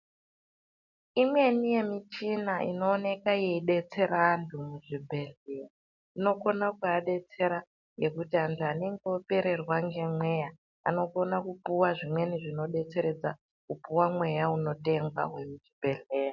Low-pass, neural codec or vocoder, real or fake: 7.2 kHz; none; real